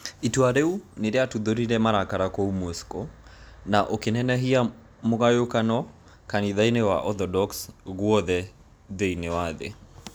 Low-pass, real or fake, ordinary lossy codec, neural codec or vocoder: none; real; none; none